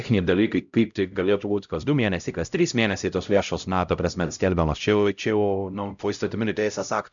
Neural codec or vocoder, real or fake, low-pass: codec, 16 kHz, 0.5 kbps, X-Codec, HuBERT features, trained on LibriSpeech; fake; 7.2 kHz